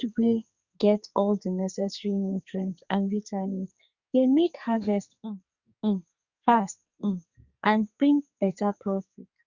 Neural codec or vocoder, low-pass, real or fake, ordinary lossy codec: codec, 44.1 kHz, 2.6 kbps, SNAC; 7.2 kHz; fake; Opus, 64 kbps